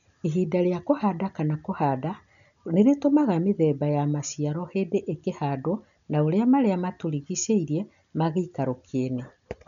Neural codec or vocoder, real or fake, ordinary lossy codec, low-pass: none; real; none; 7.2 kHz